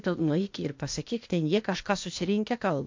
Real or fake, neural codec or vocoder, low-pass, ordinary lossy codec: fake; codec, 16 kHz, 0.8 kbps, ZipCodec; 7.2 kHz; MP3, 48 kbps